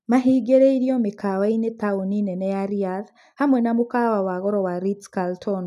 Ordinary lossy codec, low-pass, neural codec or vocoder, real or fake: none; 14.4 kHz; none; real